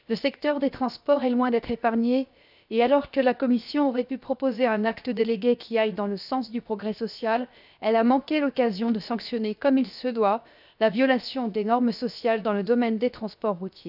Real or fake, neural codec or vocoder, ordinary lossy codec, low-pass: fake; codec, 16 kHz, 0.7 kbps, FocalCodec; none; 5.4 kHz